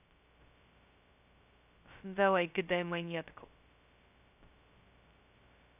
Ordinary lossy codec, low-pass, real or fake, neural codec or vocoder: none; 3.6 kHz; fake; codec, 16 kHz, 0.2 kbps, FocalCodec